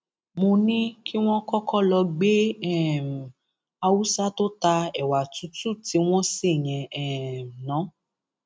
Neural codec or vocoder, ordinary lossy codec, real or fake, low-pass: none; none; real; none